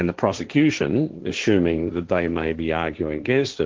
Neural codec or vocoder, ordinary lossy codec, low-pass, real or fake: codec, 16 kHz, 1.1 kbps, Voila-Tokenizer; Opus, 16 kbps; 7.2 kHz; fake